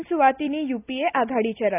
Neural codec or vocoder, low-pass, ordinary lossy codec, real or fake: none; 3.6 kHz; none; real